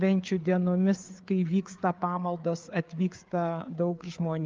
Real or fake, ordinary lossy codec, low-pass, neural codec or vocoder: fake; Opus, 32 kbps; 7.2 kHz; codec, 16 kHz, 4 kbps, FreqCodec, larger model